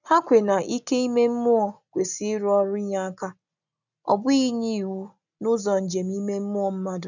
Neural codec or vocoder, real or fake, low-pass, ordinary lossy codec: none; real; 7.2 kHz; none